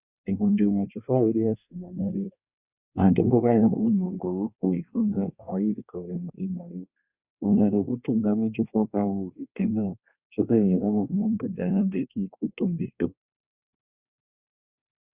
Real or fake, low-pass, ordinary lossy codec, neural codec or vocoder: fake; 3.6 kHz; Opus, 64 kbps; codec, 24 kHz, 1 kbps, SNAC